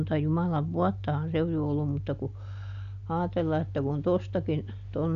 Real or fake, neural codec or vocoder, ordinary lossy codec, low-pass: real; none; none; 7.2 kHz